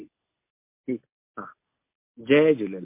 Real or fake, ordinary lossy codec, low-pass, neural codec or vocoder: real; MP3, 24 kbps; 3.6 kHz; none